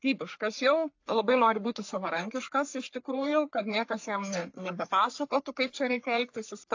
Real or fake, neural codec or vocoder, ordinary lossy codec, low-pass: fake; codec, 44.1 kHz, 3.4 kbps, Pupu-Codec; AAC, 48 kbps; 7.2 kHz